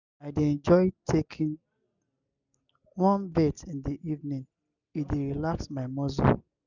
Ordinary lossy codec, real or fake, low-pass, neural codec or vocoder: none; real; 7.2 kHz; none